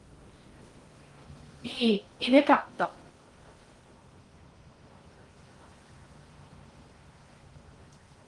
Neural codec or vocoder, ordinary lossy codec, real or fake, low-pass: codec, 16 kHz in and 24 kHz out, 0.6 kbps, FocalCodec, streaming, 4096 codes; Opus, 24 kbps; fake; 10.8 kHz